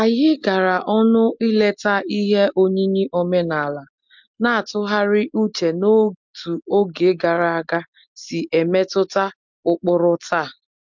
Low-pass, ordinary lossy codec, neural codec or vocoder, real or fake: 7.2 kHz; MP3, 64 kbps; none; real